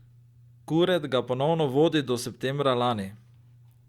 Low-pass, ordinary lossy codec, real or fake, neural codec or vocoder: 19.8 kHz; Opus, 64 kbps; real; none